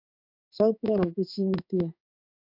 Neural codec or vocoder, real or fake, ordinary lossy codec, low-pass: codec, 16 kHz in and 24 kHz out, 1 kbps, XY-Tokenizer; fake; MP3, 48 kbps; 5.4 kHz